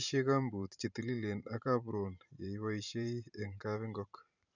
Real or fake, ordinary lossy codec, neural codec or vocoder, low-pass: fake; none; vocoder, 44.1 kHz, 128 mel bands every 512 samples, BigVGAN v2; 7.2 kHz